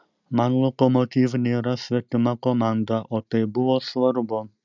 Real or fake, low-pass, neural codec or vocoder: real; 7.2 kHz; none